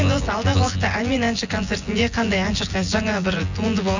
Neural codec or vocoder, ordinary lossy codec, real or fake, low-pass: vocoder, 24 kHz, 100 mel bands, Vocos; none; fake; 7.2 kHz